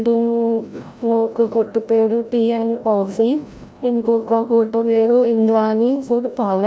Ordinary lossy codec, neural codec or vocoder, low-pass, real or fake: none; codec, 16 kHz, 0.5 kbps, FreqCodec, larger model; none; fake